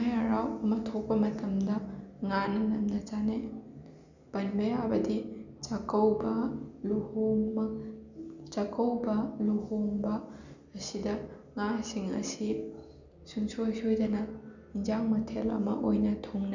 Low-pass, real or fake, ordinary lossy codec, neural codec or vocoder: 7.2 kHz; real; none; none